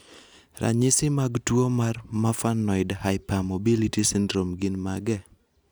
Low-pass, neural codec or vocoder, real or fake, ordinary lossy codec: none; none; real; none